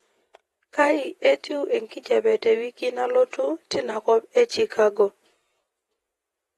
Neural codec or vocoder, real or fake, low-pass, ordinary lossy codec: vocoder, 48 kHz, 128 mel bands, Vocos; fake; 19.8 kHz; AAC, 32 kbps